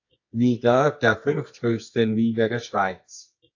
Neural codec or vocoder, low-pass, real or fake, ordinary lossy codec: codec, 24 kHz, 0.9 kbps, WavTokenizer, medium music audio release; 7.2 kHz; fake; AAC, 48 kbps